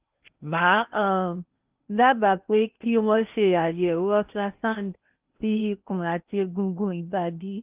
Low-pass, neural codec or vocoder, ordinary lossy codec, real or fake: 3.6 kHz; codec, 16 kHz in and 24 kHz out, 0.8 kbps, FocalCodec, streaming, 65536 codes; Opus, 24 kbps; fake